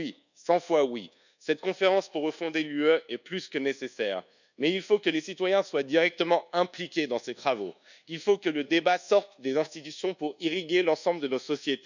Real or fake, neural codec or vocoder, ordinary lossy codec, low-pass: fake; codec, 24 kHz, 1.2 kbps, DualCodec; none; 7.2 kHz